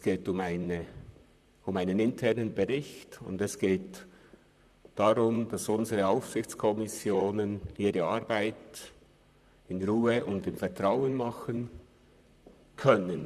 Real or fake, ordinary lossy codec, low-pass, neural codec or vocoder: fake; none; 14.4 kHz; vocoder, 44.1 kHz, 128 mel bands, Pupu-Vocoder